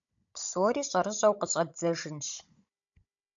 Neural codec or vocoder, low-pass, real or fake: codec, 16 kHz, 16 kbps, FunCodec, trained on Chinese and English, 50 frames a second; 7.2 kHz; fake